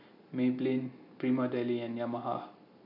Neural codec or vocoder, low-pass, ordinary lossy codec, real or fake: none; 5.4 kHz; none; real